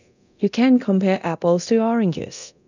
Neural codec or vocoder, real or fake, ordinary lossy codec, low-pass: codec, 24 kHz, 0.9 kbps, DualCodec; fake; none; 7.2 kHz